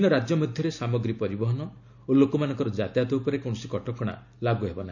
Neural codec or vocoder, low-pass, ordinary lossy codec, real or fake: none; 7.2 kHz; none; real